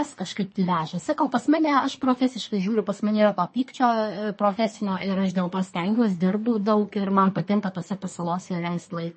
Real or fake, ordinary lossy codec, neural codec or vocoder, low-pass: fake; MP3, 32 kbps; codec, 24 kHz, 1 kbps, SNAC; 10.8 kHz